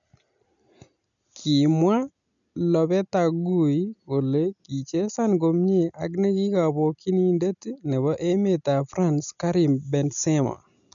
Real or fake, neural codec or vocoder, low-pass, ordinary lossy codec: real; none; 7.2 kHz; none